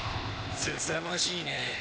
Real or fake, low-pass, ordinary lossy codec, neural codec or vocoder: fake; none; none; codec, 16 kHz, 0.8 kbps, ZipCodec